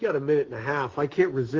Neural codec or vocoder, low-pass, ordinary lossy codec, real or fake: none; 7.2 kHz; Opus, 16 kbps; real